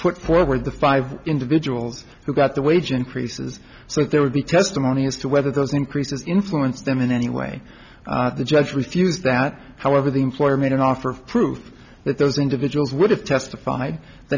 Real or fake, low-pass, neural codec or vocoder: real; 7.2 kHz; none